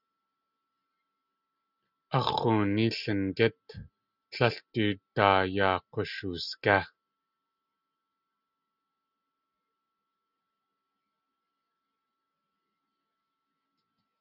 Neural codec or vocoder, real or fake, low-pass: none; real; 5.4 kHz